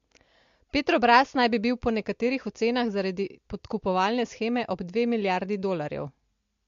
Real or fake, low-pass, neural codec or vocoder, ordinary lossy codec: real; 7.2 kHz; none; MP3, 48 kbps